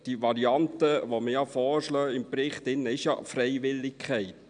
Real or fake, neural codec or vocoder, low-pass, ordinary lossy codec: real; none; 9.9 kHz; none